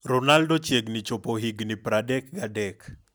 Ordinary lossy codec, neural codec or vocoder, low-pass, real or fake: none; none; none; real